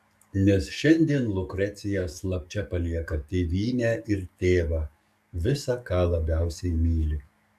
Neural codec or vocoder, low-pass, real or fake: codec, 44.1 kHz, 7.8 kbps, DAC; 14.4 kHz; fake